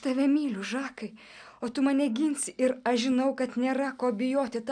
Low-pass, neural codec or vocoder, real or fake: 9.9 kHz; none; real